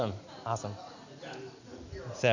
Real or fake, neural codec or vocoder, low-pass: real; none; 7.2 kHz